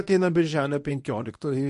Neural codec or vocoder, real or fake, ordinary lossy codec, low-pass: codec, 24 kHz, 0.9 kbps, WavTokenizer, medium speech release version 1; fake; MP3, 48 kbps; 10.8 kHz